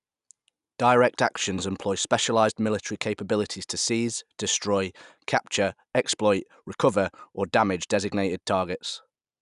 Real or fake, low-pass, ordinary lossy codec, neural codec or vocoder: real; 10.8 kHz; none; none